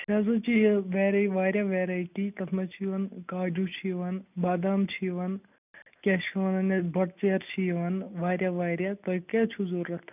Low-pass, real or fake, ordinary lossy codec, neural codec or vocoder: 3.6 kHz; real; none; none